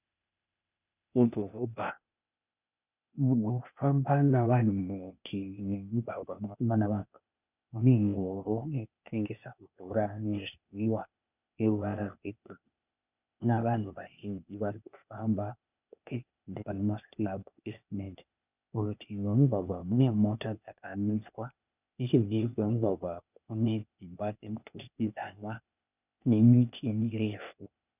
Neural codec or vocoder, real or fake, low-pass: codec, 16 kHz, 0.8 kbps, ZipCodec; fake; 3.6 kHz